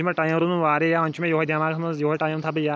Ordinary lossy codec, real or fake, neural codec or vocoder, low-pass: none; real; none; none